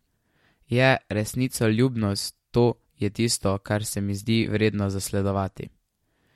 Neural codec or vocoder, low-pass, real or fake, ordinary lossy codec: none; 19.8 kHz; real; MP3, 64 kbps